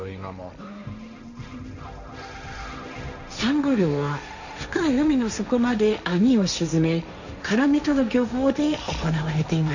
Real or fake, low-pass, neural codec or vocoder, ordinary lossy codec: fake; 7.2 kHz; codec, 16 kHz, 1.1 kbps, Voila-Tokenizer; none